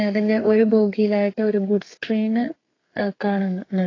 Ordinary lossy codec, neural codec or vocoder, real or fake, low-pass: AAC, 32 kbps; codec, 32 kHz, 1.9 kbps, SNAC; fake; 7.2 kHz